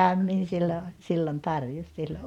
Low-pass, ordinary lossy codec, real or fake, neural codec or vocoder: 19.8 kHz; none; real; none